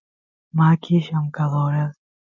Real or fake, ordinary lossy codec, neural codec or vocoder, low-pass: real; MP3, 64 kbps; none; 7.2 kHz